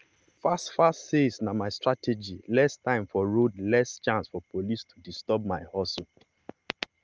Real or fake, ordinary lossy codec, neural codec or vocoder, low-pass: real; Opus, 24 kbps; none; 7.2 kHz